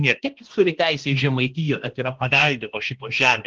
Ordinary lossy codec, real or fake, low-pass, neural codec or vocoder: Opus, 16 kbps; fake; 7.2 kHz; codec, 16 kHz, 1 kbps, X-Codec, HuBERT features, trained on balanced general audio